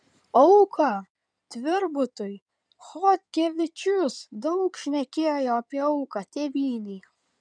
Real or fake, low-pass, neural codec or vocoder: fake; 9.9 kHz; codec, 16 kHz in and 24 kHz out, 2.2 kbps, FireRedTTS-2 codec